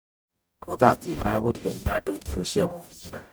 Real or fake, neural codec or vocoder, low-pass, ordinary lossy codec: fake; codec, 44.1 kHz, 0.9 kbps, DAC; none; none